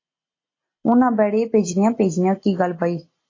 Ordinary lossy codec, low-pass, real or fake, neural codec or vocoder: AAC, 32 kbps; 7.2 kHz; real; none